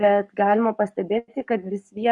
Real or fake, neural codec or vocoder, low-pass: fake; codec, 44.1 kHz, 7.8 kbps, Pupu-Codec; 10.8 kHz